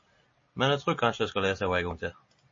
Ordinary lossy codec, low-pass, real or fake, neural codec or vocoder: MP3, 32 kbps; 7.2 kHz; real; none